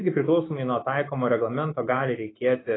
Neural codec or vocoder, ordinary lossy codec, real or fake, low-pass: autoencoder, 48 kHz, 128 numbers a frame, DAC-VAE, trained on Japanese speech; AAC, 16 kbps; fake; 7.2 kHz